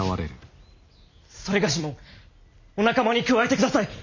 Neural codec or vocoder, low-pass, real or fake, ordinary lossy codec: none; 7.2 kHz; real; MP3, 64 kbps